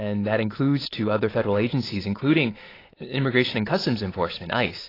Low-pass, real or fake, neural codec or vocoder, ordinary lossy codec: 5.4 kHz; real; none; AAC, 24 kbps